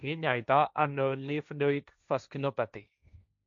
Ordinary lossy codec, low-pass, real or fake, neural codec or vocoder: none; 7.2 kHz; fake; codec, 16 kHz, 1.1 kbps, Voila-Tokenizer